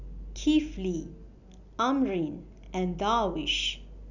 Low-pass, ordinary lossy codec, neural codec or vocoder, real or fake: 7.2 kHz; none; none; real